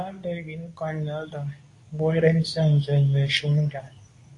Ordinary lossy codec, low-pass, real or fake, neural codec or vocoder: AAC, 48 kbps; 10.8 kHz; fake; codec, 24 kHz, 0.9 kbps, WavTokenizer, medium speech release version 2